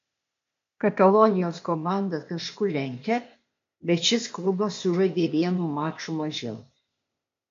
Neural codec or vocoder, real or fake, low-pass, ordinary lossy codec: codec, 16 kHz, 0.8 kbps, ZipCodec; fake; 7.2 kHz; MP3, 48 kbps